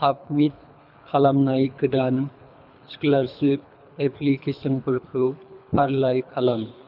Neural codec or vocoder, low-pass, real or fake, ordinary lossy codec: codec, 24 kHz, 3 kbps, HILCodec; 5.4 kHz; fake; none